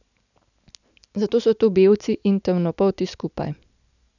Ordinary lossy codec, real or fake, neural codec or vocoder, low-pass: none; real; none; 7.2 kHz